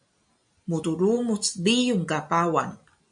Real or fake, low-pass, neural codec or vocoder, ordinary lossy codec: real; 9.9 kHz; none; MP3, 96 kbps